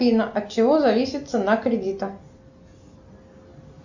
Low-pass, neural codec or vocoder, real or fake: 7.2 kHz; none; real